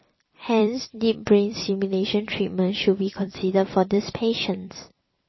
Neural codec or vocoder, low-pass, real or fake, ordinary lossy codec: vocoder, 44.1 kHz, 128 mel bands every 256 samples, BigVGAN v2; 7.2 kHz; fake; MP3, 24 kbps